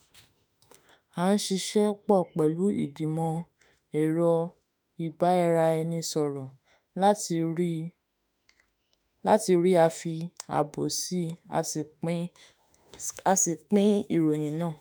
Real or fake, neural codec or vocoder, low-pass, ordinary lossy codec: fake; autoencoder, 48 kHz, 32 numbers a frame, DAC-VAE, trained on Japanese speech; none; none